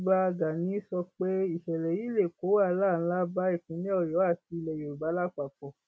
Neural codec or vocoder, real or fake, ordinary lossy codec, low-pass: none; real; none; none